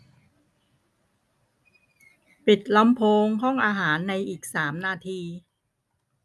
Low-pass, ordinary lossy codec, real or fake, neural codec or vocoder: none; none; real; none